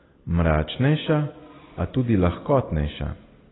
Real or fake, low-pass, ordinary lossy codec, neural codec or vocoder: real; 7.2 kHz; AAC, 16 kbps; none